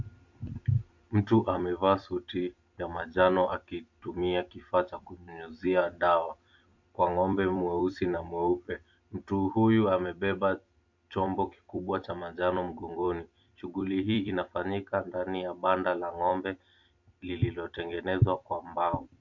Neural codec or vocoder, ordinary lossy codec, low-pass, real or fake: vocoder, 44.1 kHz, 128 mel bands every 256 samples, BigVGAN v2; MP3, 48 kbps; 7.2 kHz; fake